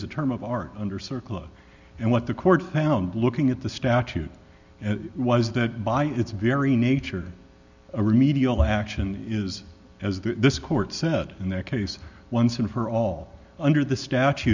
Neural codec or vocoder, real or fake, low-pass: none; real; 7.2 kHz